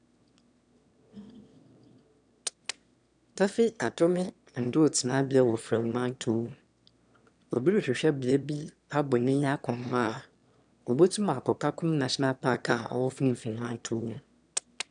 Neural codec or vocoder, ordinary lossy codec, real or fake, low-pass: autoencoder, 22.05 kHz, a latent of 192 numbers a frame, VITS, trained on one speaker; none; fake; 9.9 kHz